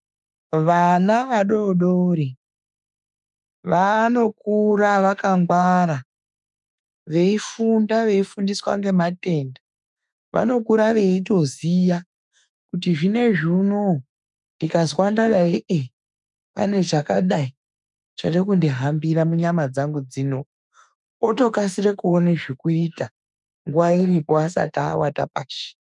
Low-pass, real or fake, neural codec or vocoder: 10.8 kHz; fake; autoencoder, 48 kHz, 32 numbers a frame, DAC-VAE, trained on Japanese speech